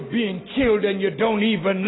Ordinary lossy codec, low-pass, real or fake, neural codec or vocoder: AAC, 16 kbps; 7.2 kHz; real; none